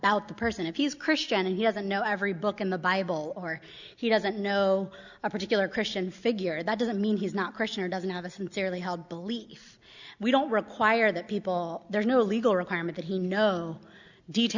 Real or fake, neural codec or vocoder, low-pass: real; none; 7.2 kHz